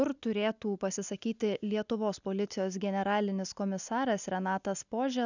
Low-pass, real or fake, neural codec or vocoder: 7.2 kHz; real; none